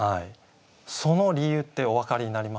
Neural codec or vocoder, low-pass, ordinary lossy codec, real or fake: none; none; none; real